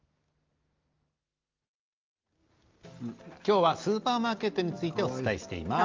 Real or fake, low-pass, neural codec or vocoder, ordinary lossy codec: real; 7.2 kHz; none; Opus, 24 kbps